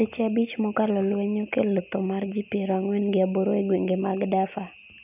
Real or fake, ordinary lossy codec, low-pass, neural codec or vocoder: real; none; 3.6 kHz; none